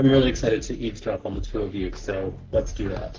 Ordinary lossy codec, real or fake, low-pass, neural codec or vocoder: Opus, 16 kbps; fake; 7.2 kHz; codec, 44.1 kHz, 3.4 kbps, Pupu-Codec